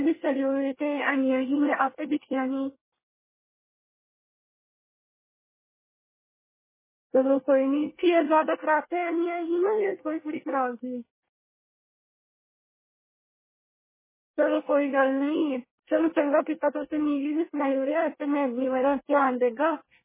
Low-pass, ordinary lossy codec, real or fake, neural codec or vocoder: 3.6 kHz; MP3, 16 kbps; fake; codec, 24 kHz, 1 kbps, SNAC